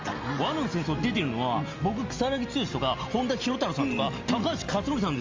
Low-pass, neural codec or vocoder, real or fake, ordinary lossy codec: 7.2 kHz; none; real; Opus, 32 kbps